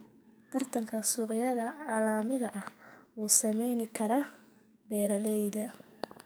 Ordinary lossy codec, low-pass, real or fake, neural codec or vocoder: none; none; fake; codec, 44.1 kHz, 2.6 kbps, SNAC